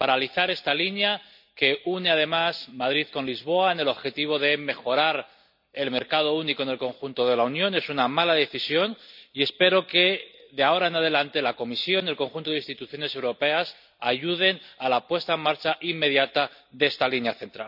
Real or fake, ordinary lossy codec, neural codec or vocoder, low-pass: real; none; none; 5.4 kHz